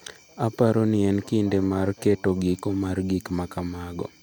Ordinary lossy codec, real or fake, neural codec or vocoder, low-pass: none; real; none; none